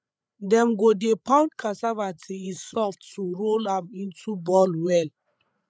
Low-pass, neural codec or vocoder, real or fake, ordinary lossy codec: none; codec, 16 kHz, 8 kbps, FreqCodec, larger model; fake; none